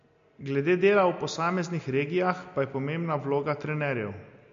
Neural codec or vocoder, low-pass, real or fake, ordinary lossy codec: none; 7.2 kHz; real; MP3, 48 kbps